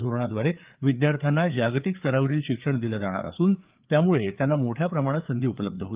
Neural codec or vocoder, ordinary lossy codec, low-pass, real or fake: codec, 16 kHz, 4 kbps, FreqCodec, larger model; Opus, 32 kbps; 3.6 kHz; fake